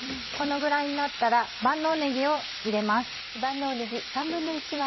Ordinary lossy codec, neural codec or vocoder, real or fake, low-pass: MP3, 24 kbps; vocoder, 44.1 kHz, 128 mel bands every 256 samples, BigVGAN v2; fake; 7.2 kHz